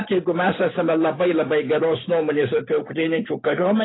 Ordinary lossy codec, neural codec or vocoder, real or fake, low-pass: AAC, 16 kbps; none; real; 7.2 kHz